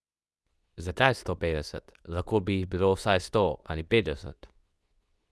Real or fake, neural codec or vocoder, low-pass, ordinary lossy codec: fake; codec, 24 kHz, 0.9 kbps, WavTokenizer, medium speech release version 2; none; none